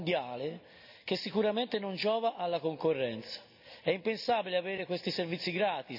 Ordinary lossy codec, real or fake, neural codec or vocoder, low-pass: none; real; none; 5.4 kHz